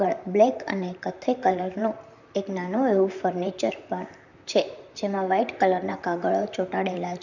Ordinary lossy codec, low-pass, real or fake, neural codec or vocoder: none; 7.2 kHz; fake; vocoder, 44.1 kHz, 128 mel bands, Pupu-Vocoder